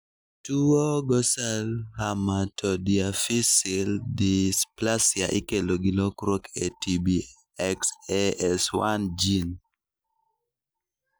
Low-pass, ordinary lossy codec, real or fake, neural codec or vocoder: none; none; real; none